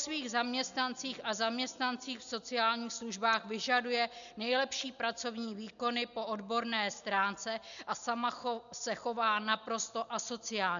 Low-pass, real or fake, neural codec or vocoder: 7.2 kHz; real; none